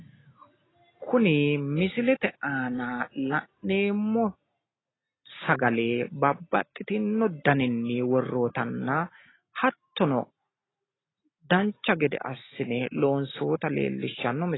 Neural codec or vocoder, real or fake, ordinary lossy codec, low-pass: none; real; AAC, 16 kbps; 7.2 kHz